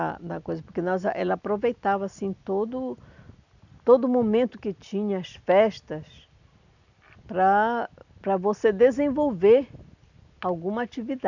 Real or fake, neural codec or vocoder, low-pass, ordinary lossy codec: real; none; 7.2 kHz; none